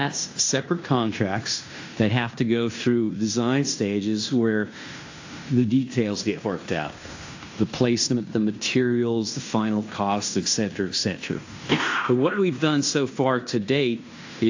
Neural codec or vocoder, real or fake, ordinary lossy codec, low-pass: codec, 16 kHz in and 24 kHz out, 0.9 kbps, LongCat-Audio-Codec, fine tuned four codebook decoder; fake; AAC, 48 kbps; 7.2 kHz